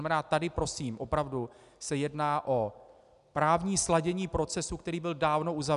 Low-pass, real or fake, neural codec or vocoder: 10.8 kHz; real; none